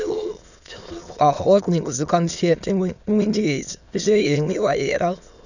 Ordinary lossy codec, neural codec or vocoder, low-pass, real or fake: none; autoencoder, 22.05 kHz, a latent of 192 numbers a frame, VITS, trained on many speakers; 7.2 kHz; fake